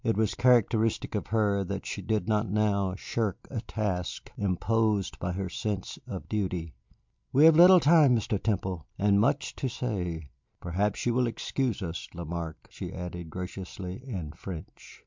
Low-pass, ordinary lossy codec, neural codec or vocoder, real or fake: 7.2 kHz; MP3, 64 kbps; none; real